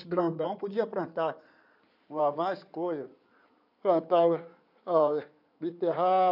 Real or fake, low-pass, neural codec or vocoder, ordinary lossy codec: fake; 5.4 kHz; codec, 16 kHz in and 24 kHz out, 2.2 kbps, FireRedTTS-2 codec; none